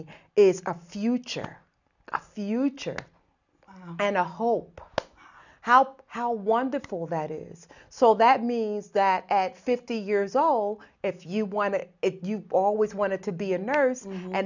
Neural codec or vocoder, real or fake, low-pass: none; real; 7.2 kHz